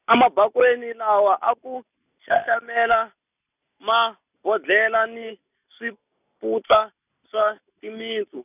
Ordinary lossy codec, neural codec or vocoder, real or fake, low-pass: none; none; real; 3.6 kHz